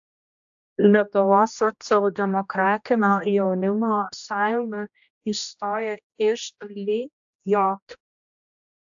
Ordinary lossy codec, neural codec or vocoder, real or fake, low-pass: AAC, 64 kbps; codec, 16 kHz, 1 kbps, X-Codec, HuBERT features, trained on general audio; fake; 7.2 kHz